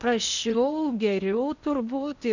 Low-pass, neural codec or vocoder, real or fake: 7.2 kHz; codec, 16 kHz in and 24 kHz out, 0.8 kbps, FocalCodec, streaming, 65536 codes; fake